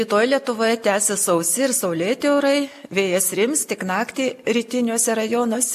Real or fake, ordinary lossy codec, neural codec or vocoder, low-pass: real; MP3, 64 kbps; none; 14.4 kHz